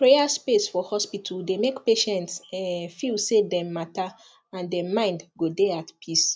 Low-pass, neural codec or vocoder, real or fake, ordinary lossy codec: none; none; real; none